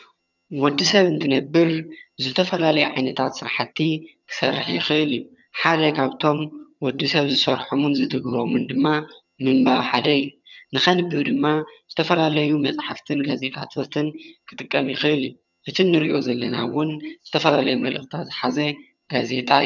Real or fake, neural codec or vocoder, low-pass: fake; vocoder, 22.05 kHz, 80 mel bands, HiFi-GAN; 7.2 kHz